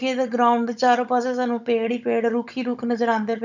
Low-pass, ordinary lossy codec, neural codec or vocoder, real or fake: 7.2 kHz; none; codec, 16 kHz, 16 kbps, FunCodec, trained on Chinese and English, 50 frames a second; fake